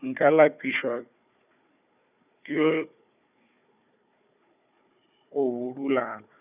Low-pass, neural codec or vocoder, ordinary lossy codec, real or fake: 3.6 kHz; codec, 16 kHz, 4 kbps, FunCodec, trained on Chinese and English, 50 frames a second; none; fake